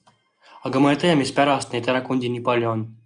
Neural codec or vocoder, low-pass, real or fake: none; 9.9 kHz; real